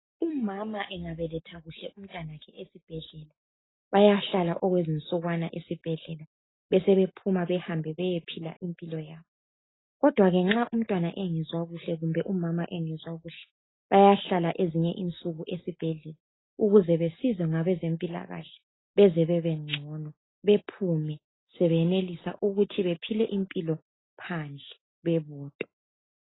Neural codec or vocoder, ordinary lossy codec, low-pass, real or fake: none; AAC, 16 kbps; 7.2 kHz; real